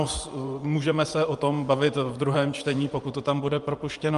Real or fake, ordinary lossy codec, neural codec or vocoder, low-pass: fake; Opus, 32 kbps; vocoder, 24 kHz, 100 mel bands, Vocos; 10.8 kHz